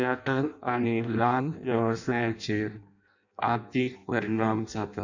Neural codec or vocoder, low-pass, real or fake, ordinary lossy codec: codec, 16 kHz in and 24 kHz out, 0.6 kbps, FireRedTTS-2 codec; 7.2 kHz; fake; none